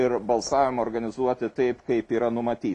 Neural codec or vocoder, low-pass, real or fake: none; 10.8 kHz; real